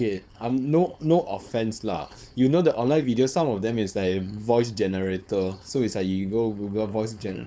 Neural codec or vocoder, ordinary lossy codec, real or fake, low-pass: codec, 16 kHz, 4.8 kbps, FACodec; none; fake; none